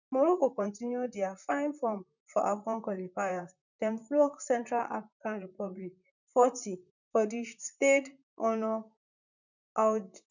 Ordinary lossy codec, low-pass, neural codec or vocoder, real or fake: none; 7.2 kHz; vocoder, 44.1 kHz, 128 mel bands, Pupu-Vocoder; fake